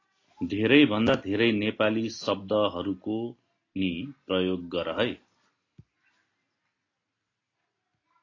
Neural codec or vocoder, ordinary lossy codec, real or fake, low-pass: none; AAC, 32 kbps; real; 7.2 kHz